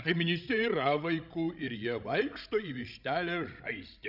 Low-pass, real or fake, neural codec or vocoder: 5.4 kHz; fake; codec, 16 kHz, 16 kbps, FreqCodec, larger model